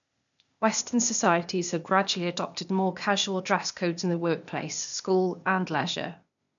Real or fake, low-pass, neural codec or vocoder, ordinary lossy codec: fake; 7.2 kHz; codec, 16 kHz, 0.8 kbps, ZipCodec; none